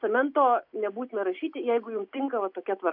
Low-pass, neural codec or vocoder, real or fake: 5.4 kHz; none; real